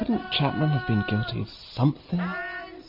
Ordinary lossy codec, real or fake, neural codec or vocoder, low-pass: MP3, 32 kbps; real; none; 5.4 kHz